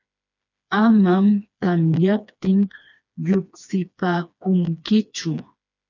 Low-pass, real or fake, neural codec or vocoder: 7.2 kHz; fake; codec, 16 kHz, 2 kbps, FreqCodec, smaller model